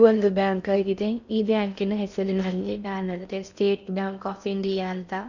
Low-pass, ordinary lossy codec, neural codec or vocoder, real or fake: 7.2 kHz; none; codec, 16 kHz in and 24 kHz out, 0.6 kbps, FocalCodec, streaming, 4096 codes; fake